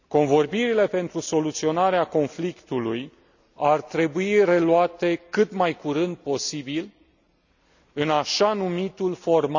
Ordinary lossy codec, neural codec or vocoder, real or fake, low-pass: none; none; real; 7.2 kHz